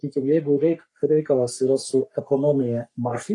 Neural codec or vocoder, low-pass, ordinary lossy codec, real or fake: codec, 44.1 kHz, 3.4 kbps, Pupu-Codec; 10.8 kHz; AAC, 48 kbps; fake